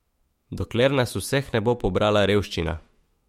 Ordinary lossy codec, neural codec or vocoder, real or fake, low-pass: MP3, 64 kbps; autoencoder, 48 kHz, 128 numbers a frame, DAC-VAE, trained on Japanese speech; fake; 19.8 kHz